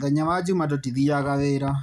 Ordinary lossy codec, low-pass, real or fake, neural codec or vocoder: none; 14.4 kHz; real; none